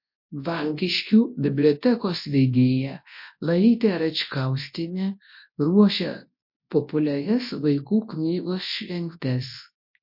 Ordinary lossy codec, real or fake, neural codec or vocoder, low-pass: MP3, 32 kbps; fake; codec, 24 kHz, 0.9 kbps, WavTokenizer, large speech release; 5.4 kHz